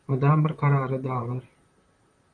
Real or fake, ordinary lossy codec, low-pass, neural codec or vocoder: fake; MP3, 64 kbps; 9.9 kHz; vocoder, 44.1 kHz, 128 mel bands, Pupu-Vocoder